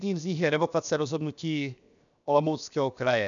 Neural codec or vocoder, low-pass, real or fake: codec, 16 kHz, 0.7 kbps, FocalCodec; 7.2 kHz; fake